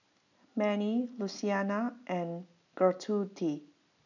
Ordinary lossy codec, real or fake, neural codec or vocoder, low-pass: none; real; none; 7.2 kHz